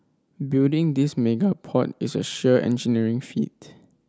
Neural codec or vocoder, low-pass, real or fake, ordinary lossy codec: none; none; real; none